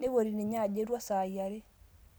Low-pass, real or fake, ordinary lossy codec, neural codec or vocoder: none; real; none; none